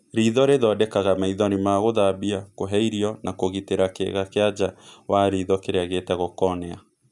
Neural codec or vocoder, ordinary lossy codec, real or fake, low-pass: none; none; real; 10.8 kHz